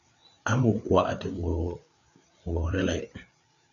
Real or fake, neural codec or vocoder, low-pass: fake; codec, 16 kHz, 4 kbps, FreqCodec, larger model; 7.2 kHz